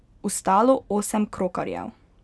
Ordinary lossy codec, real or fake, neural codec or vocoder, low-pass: none; real; none; none